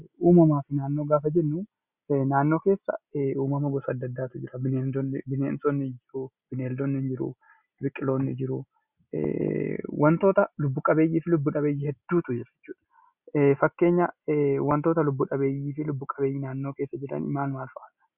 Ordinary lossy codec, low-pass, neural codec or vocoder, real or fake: Opus, 64 kbps; 3.6 kHz; none; real